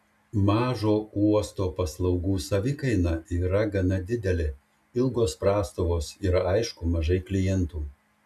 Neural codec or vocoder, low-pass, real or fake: none; 14.4 kHz; real